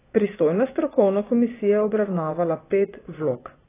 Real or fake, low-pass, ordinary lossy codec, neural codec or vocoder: fake; 3.6 kHz; AAC, 16 kbps; vocoder, 24 kHz, 100 mel bands, Vocos